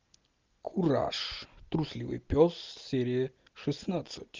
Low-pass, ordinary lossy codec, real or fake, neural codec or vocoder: 7.2 kHz; Opus, 24 kbps; real; none